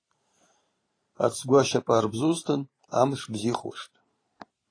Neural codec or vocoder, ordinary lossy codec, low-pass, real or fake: vocoder, 44.1 kHz, 128 mel bands every 512 samples, BigVGAN v2; AAC, 32 kbps; 9.9 kHz; fake